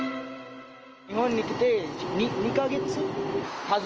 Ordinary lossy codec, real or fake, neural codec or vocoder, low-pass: Opus, 24 kbps; real; none; 7.2 kHz